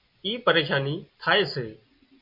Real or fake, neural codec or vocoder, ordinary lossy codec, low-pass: real; none; MP3, 32 kbps; 5.4 kHz